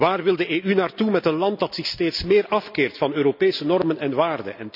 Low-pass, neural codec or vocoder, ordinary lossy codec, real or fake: 5.4 kHz; none; none; real